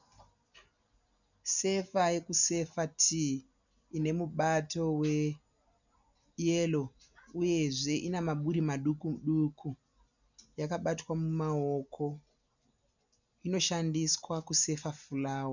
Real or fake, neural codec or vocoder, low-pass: real; none; 7.2 kHz